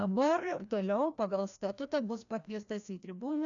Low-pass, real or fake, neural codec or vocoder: 7.2 kHz; fake; codec, 16 kHz, 1 kbps, FreqCodec, larger model